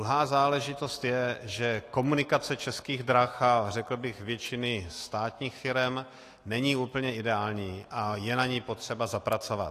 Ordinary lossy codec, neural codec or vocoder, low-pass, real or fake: AAC, 48 kbps; autoencoder, 48 kHz, 128 numbers a frame, DAC-VAE, trained on Japanese speech; 14.4 kHz; fake